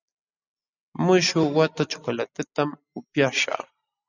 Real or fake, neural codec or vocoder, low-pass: real; none; 7.2 kHz